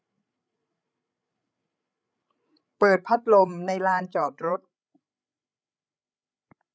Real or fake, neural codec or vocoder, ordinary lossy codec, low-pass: fake; codec, 16 kHz, 16 kbps, FreqCodec, larger model; none; none